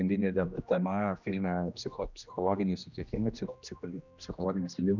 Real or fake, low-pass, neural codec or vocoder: fake; 7.2 kHz; codec, 16 kHz, 1 kbps, X-Codec, HuBERT features, trained on general audio